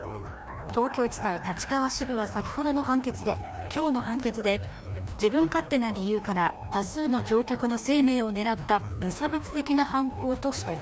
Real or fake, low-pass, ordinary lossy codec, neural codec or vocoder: fake; none; none; codec, 16 kHz, 1 kbps, FreqCodec, larger model